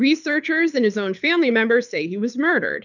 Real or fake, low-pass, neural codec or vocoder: fake; 7.2 kHz; codec, 24 kHz, 6 kbps, HILCodec